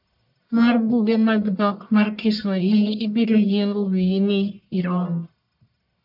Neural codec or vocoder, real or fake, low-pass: codec, 44.1 kHz, 1.7 kbps, Pupu-Codec; fake; 5.4 kHz